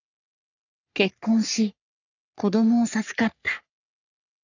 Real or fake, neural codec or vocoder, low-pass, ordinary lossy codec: fake; codec, 44.1 kHz, 3.4 kbps, Pupu-Codec; 7.2 kHz; none